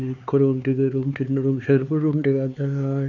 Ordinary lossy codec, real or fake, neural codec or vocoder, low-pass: none; fake; codec, 16 kHz, 4 kbps, X-Codec, HuBERT features, trained on LibriSpeech; 7.2 kHz